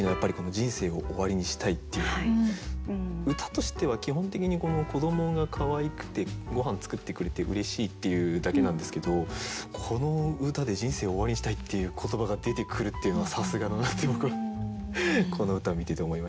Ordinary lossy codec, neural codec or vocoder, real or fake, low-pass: none; none; real; none